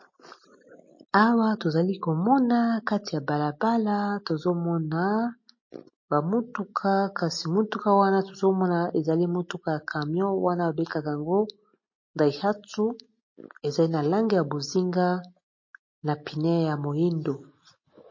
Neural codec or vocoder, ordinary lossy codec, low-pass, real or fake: none; MP3, 32 kbps; 7.2 kHz; real